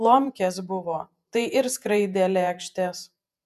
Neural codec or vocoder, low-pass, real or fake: vocoder, 48 kHz, 128 mel bands, Vocos; 14.4 kHz; fake